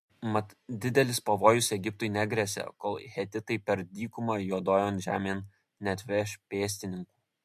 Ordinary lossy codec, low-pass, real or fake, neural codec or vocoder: MP3, 64 kbps; 14.4 kHz; real; none